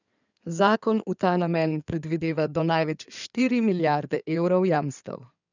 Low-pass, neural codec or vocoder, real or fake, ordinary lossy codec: 7.2 kHz; codec, 16 kHz in and 24 kHz out, 2.2 kbps, FireRedTTS-2 codec; fake; none